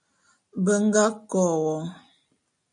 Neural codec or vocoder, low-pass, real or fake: none; 9.9 kHz; real